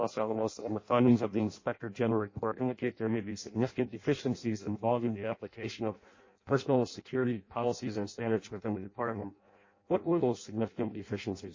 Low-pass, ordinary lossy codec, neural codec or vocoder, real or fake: 7.2 kHz; MP3, 32 kbps; codec, 16 kHz in and 24 kHz out, 0.6 kbps, FireRedTTS-2 codec; fake